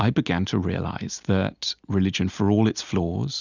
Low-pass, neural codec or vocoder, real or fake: 7.2 kHz; none; real